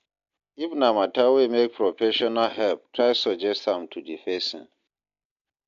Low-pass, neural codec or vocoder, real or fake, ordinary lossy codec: 7.2 kHz; none; real; AAC, 64 kbps